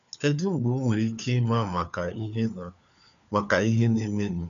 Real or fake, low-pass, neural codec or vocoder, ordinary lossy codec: fake; 7.2 kHz; codec, 16 kHz, 4 kbps, FunCodec, trained on LibriTTS, 50 frames a second; none